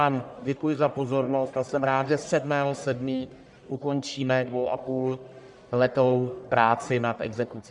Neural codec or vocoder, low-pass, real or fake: codec, 44.1 kHz, 1.7 kbps, Pupu-Codec; 10.8 kHz; fake